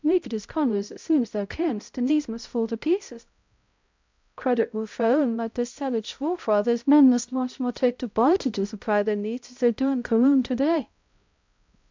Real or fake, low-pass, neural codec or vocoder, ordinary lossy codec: fake; 7.2 kHz; codec, 16 kHz, 0.5 kbps, X-Codec, HuBERT features, trained on balanced general audio; MP3, 64 kbps